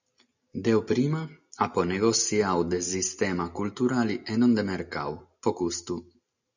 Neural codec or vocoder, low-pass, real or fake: none; 7.2 kHz; real